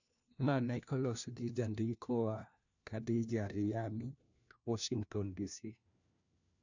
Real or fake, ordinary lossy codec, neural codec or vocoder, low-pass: fake; none; codec, 16 kHz, 1 kbps, FunCodec, trained on LibriTTS, 50 frames a second; 7.2 kHz